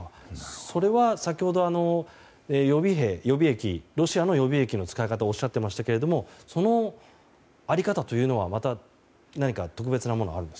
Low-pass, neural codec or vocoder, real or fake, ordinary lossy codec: none; none; real; none